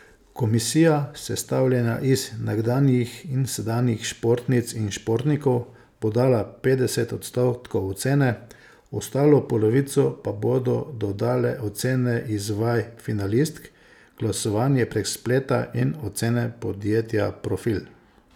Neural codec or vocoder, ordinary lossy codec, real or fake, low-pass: none; none; real; 19.8 kHz